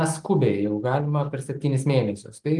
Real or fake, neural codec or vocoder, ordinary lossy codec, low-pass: real; none; Opus, 24 kbps; 10.8 kHz